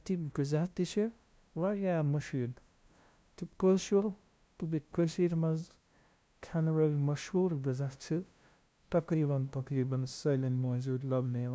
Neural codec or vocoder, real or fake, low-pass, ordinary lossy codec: codec, 16 kHz, 0.5 kbps, FunCodec, trained on LibriTTS, 25 frames a second; fake; none; none